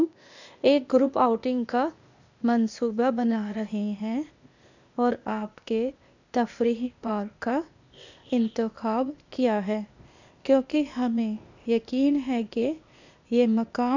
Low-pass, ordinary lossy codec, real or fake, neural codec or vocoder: 7.2 kHz; none; fake; codec, 16 kHz, 0.8 kbps, ZipCodec